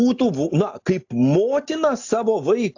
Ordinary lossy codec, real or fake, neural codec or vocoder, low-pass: AAC, 48 kbps; real; none; 7.2 kHz